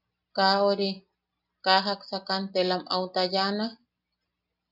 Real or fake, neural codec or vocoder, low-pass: real; none; 5.4 kHz